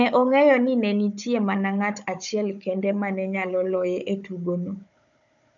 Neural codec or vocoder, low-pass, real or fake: codec, 16 kHz, 16 kbps, FunCodec, trained on Chinese and English, 50 frames a second; 7.2 kHz; fake